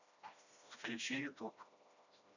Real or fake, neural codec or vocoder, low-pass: fake; codec, 16 kHz, 1 kbps, FreqCodec, smaller model; 7.2 kHz